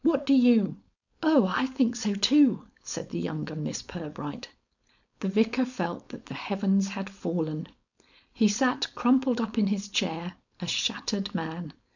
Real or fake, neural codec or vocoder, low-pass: fake; codec, 16 kHz, 4.8 kbps, FACodec; 7.2 kHz